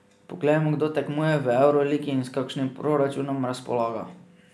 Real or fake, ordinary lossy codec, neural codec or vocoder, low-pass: real; none; none; none